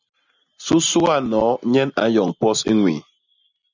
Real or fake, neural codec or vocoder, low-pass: real; none; 7.2 kHz